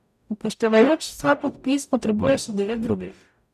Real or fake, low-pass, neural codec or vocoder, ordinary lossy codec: fake; 14.4 kHz; codec, 44.1 kHz, 0.9 kbps, DAC; none